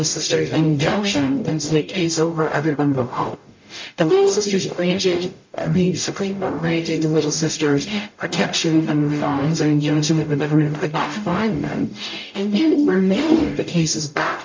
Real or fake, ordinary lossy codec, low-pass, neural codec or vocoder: fake; MP3, 48 kbps; 7.2 kHz; codec, 44.1 kHz, 0.9 kbps, DAC